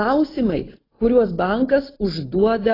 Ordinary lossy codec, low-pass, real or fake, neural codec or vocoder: AAC, 24 kbps; 5.4 kHz; real; none